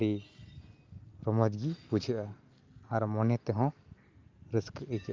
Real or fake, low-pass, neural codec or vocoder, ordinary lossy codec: real; 7.2 kHz; none; Opus, 24 kbps